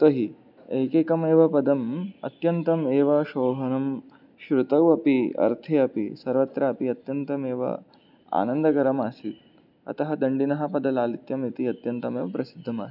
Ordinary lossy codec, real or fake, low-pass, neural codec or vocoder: none; real; 5.4 kHz; none